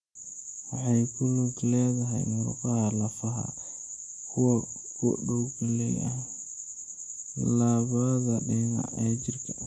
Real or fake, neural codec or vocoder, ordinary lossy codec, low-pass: real; none; none; none